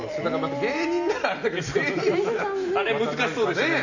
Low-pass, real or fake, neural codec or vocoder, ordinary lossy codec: 7.2 kHz; real; none; none